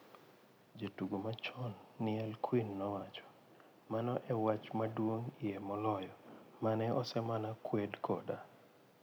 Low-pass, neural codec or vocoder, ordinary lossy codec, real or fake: none; none; none; real